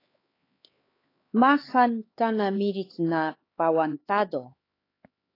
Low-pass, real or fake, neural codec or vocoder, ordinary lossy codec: 5.4 kHz; fake; codec, 16 kHz, 4 kbps, X-Codec, HuBERT features, trained on LibriSpeech; AAC, 24 kbps